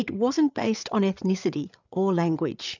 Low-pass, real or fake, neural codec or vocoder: 7.2 kHz; fake; codec, 16 kHz, 8 kbps, FreqCodec, larger model